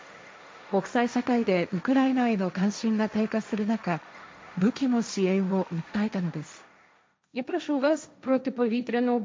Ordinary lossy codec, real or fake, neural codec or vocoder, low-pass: none; fake; codec, 16 kHz, 1.1 kbps, Voila-Tokenizer; none